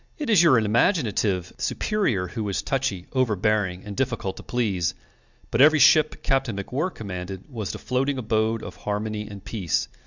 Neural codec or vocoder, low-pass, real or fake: none; 7.2 kHz; real